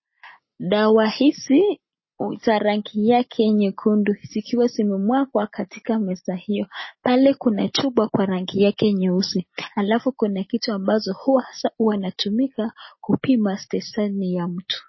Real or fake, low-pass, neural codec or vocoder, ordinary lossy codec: real; 7.2 kHz; none; MP3, 24 kbps